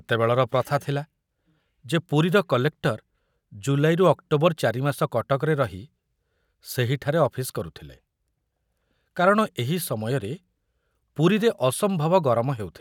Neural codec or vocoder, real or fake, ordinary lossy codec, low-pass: none; real; none; 19.8 kHz